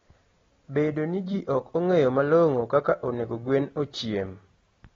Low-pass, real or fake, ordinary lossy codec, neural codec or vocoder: 7.2 kHz; real; AAC, 24 kbps; none